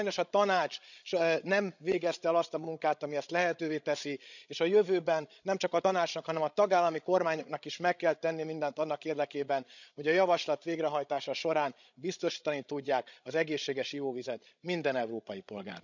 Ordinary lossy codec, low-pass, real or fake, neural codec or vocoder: none; 7.2 kHz; fake; codec, 16 kHz, 16 kbps, FreqCodec, larger model